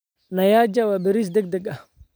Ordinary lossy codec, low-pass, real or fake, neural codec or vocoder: none; none; real; none